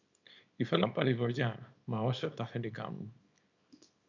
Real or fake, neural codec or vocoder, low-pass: fake; codec, 24 kHz, 0.9 kbps, WavTokenizer, small release; 7.2 kHz